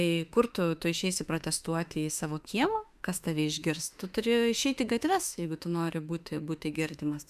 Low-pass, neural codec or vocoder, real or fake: 14.4 kHz; autoencoder, 48 kHz, 32 numbers a frame, DAC-VAE, trained on Japanese speech; fake